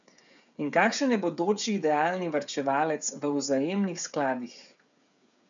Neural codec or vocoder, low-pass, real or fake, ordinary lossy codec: codec, 16 kHz, 8 kbps, FreqCodec, smaller model; 7.2 kHz; fake; none